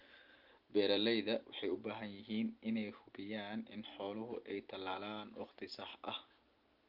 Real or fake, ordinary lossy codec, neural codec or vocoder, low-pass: real; Opus, 32 kbps; none; 5.4 kHz